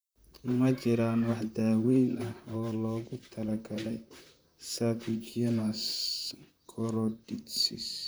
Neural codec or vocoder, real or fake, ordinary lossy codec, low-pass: vocoder, 44.1 kHz, 128 mel bands, Pupu-Vocoder; fake; none; none